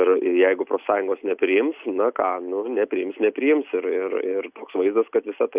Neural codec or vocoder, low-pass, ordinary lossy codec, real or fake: none; 3.6 kHz; Opus, 64 kbps; real